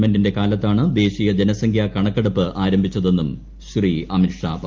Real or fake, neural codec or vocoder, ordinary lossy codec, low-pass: real; none; Opus, 24 kbps; 7.2 kHz